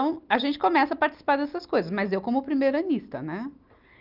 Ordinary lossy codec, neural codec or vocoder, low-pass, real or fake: Opus, 32 kbps; none; 5.4 kHz; real